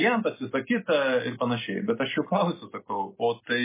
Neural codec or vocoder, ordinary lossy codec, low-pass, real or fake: none; MP3, 16 kbps; 3.6 kHz; real